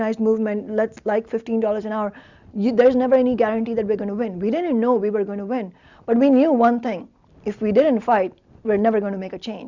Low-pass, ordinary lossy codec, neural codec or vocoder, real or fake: 7.2 kHz; Opus, 64 kbps; none; real